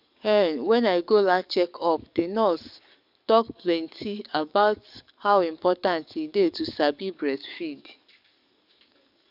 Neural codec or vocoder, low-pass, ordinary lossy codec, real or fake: codec, 44.1 kHz, 7.8 kbps, DAC; 5.4 kHz; none; fake